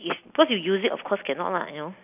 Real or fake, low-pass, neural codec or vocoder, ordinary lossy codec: real; 3.6 kHz; none; none